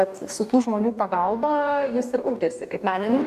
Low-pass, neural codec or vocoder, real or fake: 14.4 kHz; codec, 44.1 kHz, 2.6 kbps, DAC; fake